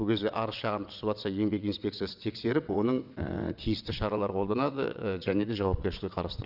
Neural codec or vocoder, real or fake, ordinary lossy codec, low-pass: vocoder, 22.05 kHz, 80 mel bands, WaveNeXt; fake; none; 5.4 kHz